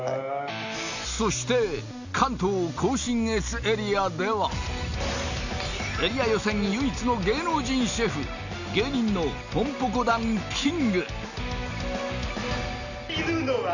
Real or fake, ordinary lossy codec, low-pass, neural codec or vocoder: real; none; 7.2 kHz; none